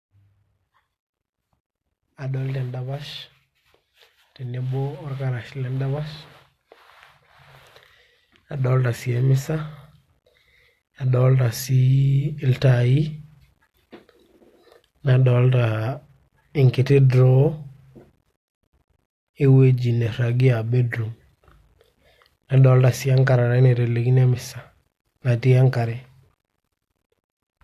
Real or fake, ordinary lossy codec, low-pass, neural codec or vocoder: real; AAC, 64 kbps; 14.4 kHz; none